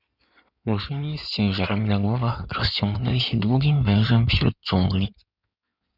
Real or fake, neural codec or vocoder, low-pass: fake; codec, 16 kHz in and 24 kHz out, 2.2 kbps, FireRedTTS-2 codec; 5.4 kHz